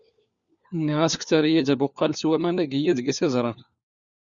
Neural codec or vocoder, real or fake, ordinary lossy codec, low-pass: codec, 16 kHz, 4 kbps, FunCodec, trained on LibriTTS, 50 frames a second; fake; Opus, 64 kbps; 7.2 kHz